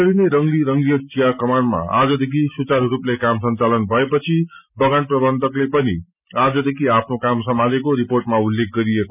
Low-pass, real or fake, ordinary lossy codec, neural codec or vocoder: 3.6 kHz; real; none; none